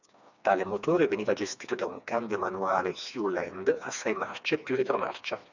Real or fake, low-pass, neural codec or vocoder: fake; 7.2 kHz; codec, 16 kHz, 2 kbps, FreqCodec, smaller model